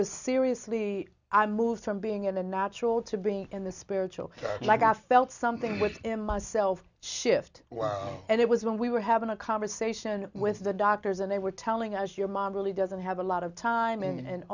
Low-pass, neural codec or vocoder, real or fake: 7.2 kHz; none; real